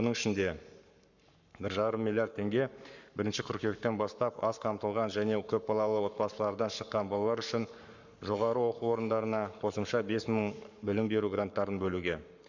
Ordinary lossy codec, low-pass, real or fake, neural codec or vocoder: none; 7.2 kHz; fake; codec, 44.1 kHz, 7.8 kbps, Pupu-Codec